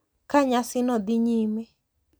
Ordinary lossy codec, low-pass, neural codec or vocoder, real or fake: none; none; none; real